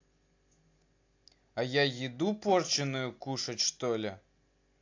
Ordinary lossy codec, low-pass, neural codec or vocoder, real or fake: AAC, 48 kbps; 7.2 kHz; none; real